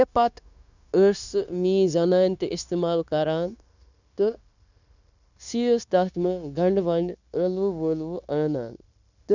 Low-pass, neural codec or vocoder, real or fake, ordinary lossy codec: 7.2 kHz; codec, 16 kHz, 0.9 kbps, LongCat-Audio-Codec; fake; none